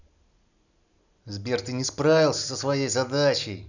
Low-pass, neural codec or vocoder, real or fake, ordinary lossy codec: 7.2 kHz; none; real; none